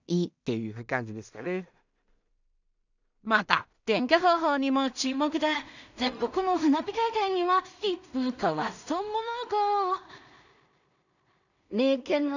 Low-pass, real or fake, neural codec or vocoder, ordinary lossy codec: 7.2 kHz; fake; codec, 16 kHz in and 24 kHz out, 0.4 kbps, LongCat-Audio-Codec, two codebook decoder; none